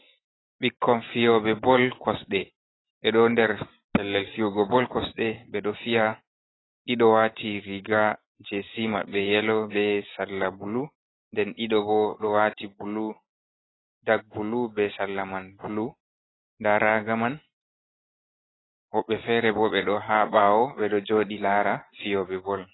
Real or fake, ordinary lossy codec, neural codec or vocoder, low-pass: real; AAC, 16 kbps; none; 7.2 kHz